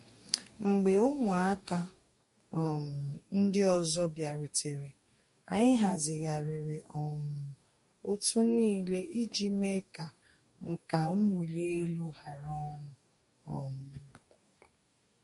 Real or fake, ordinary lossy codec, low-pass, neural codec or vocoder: fake; MP3, 48 kbps; 14.4 kHz; codec, 44.1 kHz, 2.6 kbps, DAC